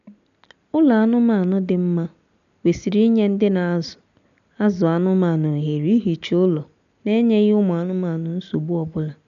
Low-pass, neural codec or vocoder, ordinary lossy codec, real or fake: 7.2 kHz; none; none; real